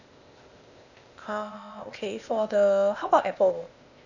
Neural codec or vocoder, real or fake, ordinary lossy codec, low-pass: codec, 16 kHz, 0.8 kbps, ZipCodec; fake; none; 7.2 kHz